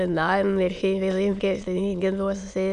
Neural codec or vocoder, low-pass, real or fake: autoencoder, 22.05 kHz, a latent of 192 numbers a frame, VITS, trained on many speakers; 9.9 kHz; fake